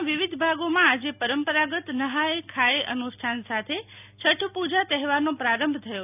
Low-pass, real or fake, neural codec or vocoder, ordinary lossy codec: 3.6 kHz; real; none; none